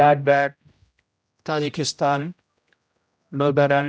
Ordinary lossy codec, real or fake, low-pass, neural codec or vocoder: none; fake; none; codec, 16 kHz, 0.5 kbps, X-Codec, HuBERT features, trained on general audio